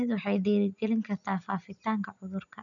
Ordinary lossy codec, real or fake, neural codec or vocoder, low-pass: none; real; none; 7.2 kHz